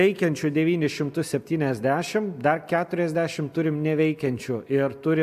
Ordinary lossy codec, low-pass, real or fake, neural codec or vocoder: AAC, 96 kbps; 14.4 kHz; real; none